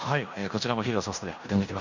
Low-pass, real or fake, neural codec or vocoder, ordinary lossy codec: 7.2 kHz; fake; codec, 16 kHz in and 24 kHz out, 0.9 kbps, LongCat-Audio-Codec, fine tuned four codebook decoder; none